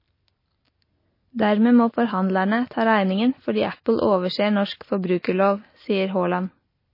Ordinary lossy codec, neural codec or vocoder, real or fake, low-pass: MP3, 24 kbps; none; real; 5.4 kHz